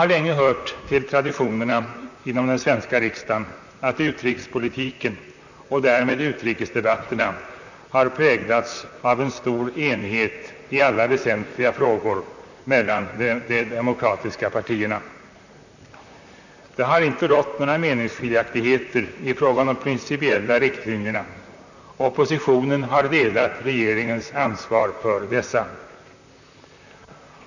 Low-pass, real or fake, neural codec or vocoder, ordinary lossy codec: 7.2 kHz; fake; vocoder, 44.1 kHz, 128 mel bands, Pupu-Vocoder; none